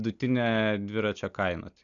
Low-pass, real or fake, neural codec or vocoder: 7.2 kHz; real; none